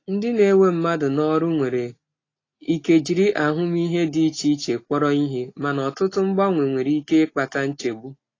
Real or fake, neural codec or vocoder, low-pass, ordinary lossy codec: real; none; 7.2 kHz; AAC, 32 kbps